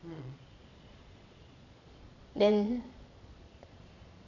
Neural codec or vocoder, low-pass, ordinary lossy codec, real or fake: vocoder, 22.05 kHz, 80 mel bands, Vocos; 7.2 kHz; none; fake